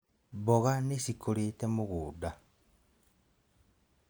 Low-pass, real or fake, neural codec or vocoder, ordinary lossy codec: none; real; none; none